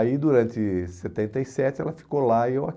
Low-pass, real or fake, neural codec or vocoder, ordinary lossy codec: none; real; none; none